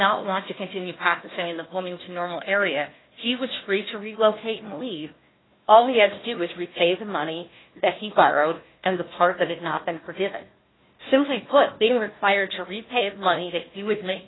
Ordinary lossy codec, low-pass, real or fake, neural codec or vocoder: AAC, 16 kbps; 7.2 kHz; fake; codec, 16 kHz, 1 kbps, FunCodec, trained on Chinese and English, 50 frames a second